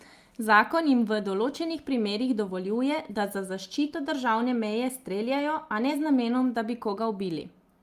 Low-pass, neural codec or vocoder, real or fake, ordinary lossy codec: 14.4 kHz; none; real; Opus, 32 kbps